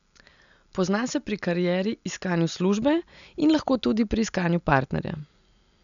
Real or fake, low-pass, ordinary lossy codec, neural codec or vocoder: real; 7.2 kHz; none; none